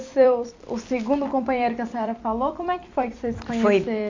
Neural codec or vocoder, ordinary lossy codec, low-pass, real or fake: vocoder, 44.1 kHz, 128 mel bands every 256 samples, BigVGAN v2; MP3, 48 kbps; 7.2 kHz; fake